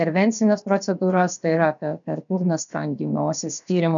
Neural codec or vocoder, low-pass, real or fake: codec, 16 kHz, about 1 kbps, DyCAST, with the encoder's durations; 7.2 kHz; fake